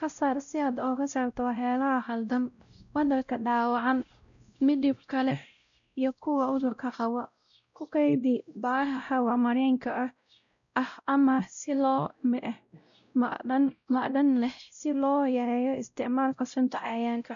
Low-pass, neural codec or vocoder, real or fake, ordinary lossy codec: 7.2 kHz; codec, 16 kHz, 0.5 kbps, X-Codec, WavLM features, trained on Multilingual LibriSpeech; fake; none